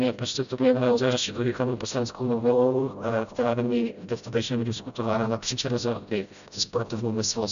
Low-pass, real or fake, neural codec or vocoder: 7.2 kHz; fake; codec, 16 kHz, 0.5 kbps, FreqCodec, smaller model